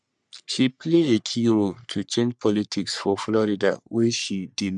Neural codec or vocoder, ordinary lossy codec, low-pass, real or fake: codec, 44.1 kHz, 3.4 kbps, Pupu-Codec; none; 10.8 kHz; fake